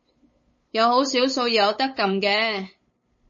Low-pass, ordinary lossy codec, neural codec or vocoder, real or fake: 7.2 kHz; MP3, 32 kbps; codec, 16 kHz, 8 kbps, FunCodec, trained on LibriTTS, 25 frames a second; fake